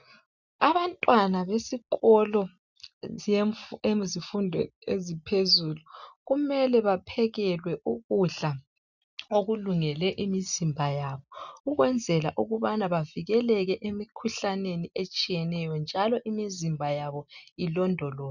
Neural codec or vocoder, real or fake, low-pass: none; real; 7.2 kHz